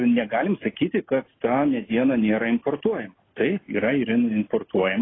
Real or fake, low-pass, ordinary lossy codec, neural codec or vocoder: real; 7.2 kHz; AAC, 16 kbps; none